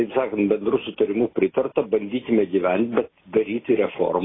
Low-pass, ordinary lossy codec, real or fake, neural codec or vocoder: 7.2 kHz; AAC, 16 kbps; real; none